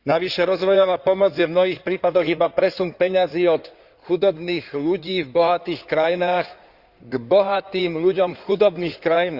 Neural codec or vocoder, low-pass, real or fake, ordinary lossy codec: codec, 16 kHz in and 24 kHz out, 2.2 kbps, FireRedTTS-2 codec; 5.4 kHz; fake; Opus, 64 kbps